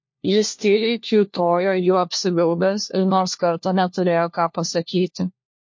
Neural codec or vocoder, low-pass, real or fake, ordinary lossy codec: codec, 16 kHz, 1 kbps, FunCodec, trained on LibriTTS, 50 frames a second; 7.2 kHz; fake; MP3, 48 kbps